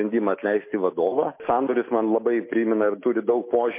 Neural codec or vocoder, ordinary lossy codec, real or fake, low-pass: none; MP3, 24 kbps; real; 3.6 kHz